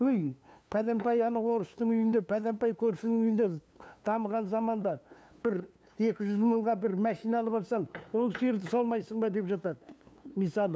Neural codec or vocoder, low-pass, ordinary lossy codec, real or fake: codec, 16 kHz, 2 kbps, FunCodec, trained on LibriTTS, 25 frames a second; none; none; fake